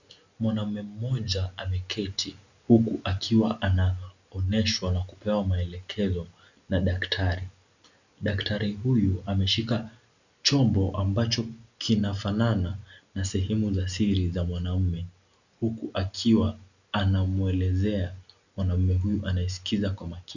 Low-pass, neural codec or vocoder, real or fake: 7.2 kHz; none; real